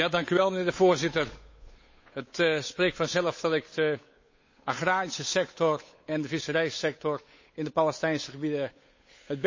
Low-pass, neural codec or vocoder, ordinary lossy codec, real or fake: 7.2 kHz; codec, 16 kHz, 8 kbps, FunCodec, trained on Chinese and English, 25 frames a second; MP3, 32 kbps; fake